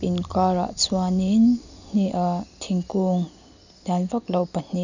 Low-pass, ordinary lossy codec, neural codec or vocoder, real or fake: 7.2 kHz; none; none; real